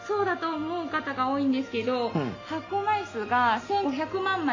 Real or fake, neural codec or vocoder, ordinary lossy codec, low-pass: real; none; AAC, 32 kbps; 7.2 kHz